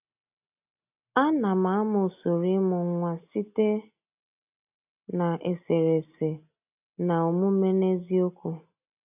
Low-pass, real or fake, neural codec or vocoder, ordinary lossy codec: 3.6 kHz; real; none; AAC, 32 kbps